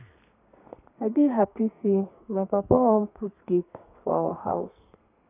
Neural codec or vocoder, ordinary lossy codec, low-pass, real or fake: codec, 32 kHz, 1.9 kbps, SNAC; none; 3.6 kHz; fake